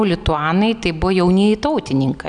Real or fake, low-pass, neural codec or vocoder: real; 9.9 kHz; none